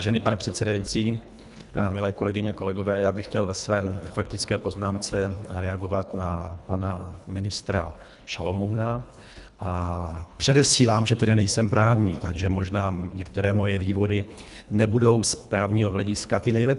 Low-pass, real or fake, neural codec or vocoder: 10.8 kHz; fake; codec, 24 kHz, 1.5 kbps, HILCodec